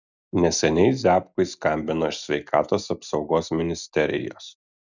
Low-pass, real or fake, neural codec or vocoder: 7.2 kHz; real; none